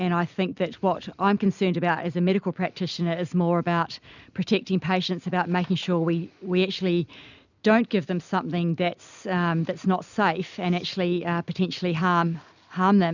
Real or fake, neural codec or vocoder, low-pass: real; none; 7.2 kHz